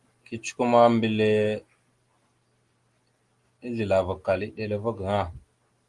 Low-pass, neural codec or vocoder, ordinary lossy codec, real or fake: 10.8 kHz; none; Opus, 24 kbps; real